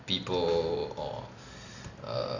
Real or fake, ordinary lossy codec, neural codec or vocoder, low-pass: real; AAC, 48 kbps; none; 7.2 kHz